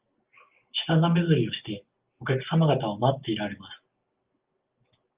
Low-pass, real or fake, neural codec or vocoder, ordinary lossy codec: 3.6 kHz; real; none; Opus, 32 kbps